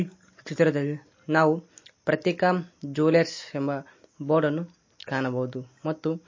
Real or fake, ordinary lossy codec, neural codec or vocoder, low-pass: real; MP3, 32 kbps; none; 7.2 kHz